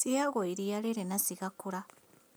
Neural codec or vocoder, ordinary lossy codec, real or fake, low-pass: none; none; real; none